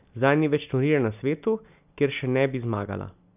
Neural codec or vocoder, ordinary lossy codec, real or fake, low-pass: none; none; real; 3.6 kHz